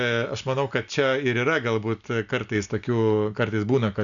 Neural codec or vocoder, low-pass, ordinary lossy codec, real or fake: none; 7.2 kHz; MP3, 96 kbps; real